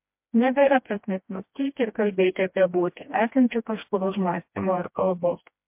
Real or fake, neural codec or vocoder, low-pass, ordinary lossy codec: fake; codec, 16 kHz, 1 kbps, FreqCodec, smaller model; 3.6 kHz; MP3, 32 kbps